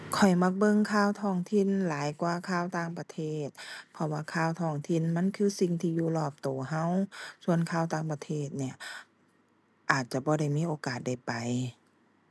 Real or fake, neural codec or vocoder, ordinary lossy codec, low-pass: real; none; none; none